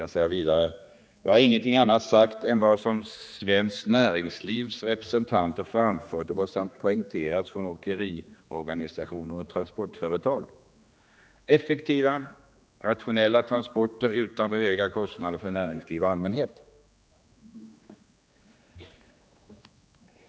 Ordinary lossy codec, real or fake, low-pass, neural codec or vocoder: none; fake; none; codec, 16 kHz, 2 kbps, X-Codec, HuBERT features, trained on general audio